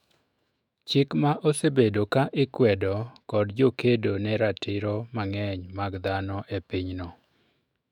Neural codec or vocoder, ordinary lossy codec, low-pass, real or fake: autoencoder, 48 kHz, 128 numbers a frame, DAC-VAE, trained on Japanese speech; none; 19.8 kHz; fake